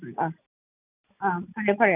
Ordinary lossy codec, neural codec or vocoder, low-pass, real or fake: none; none; 3.6 kHz; real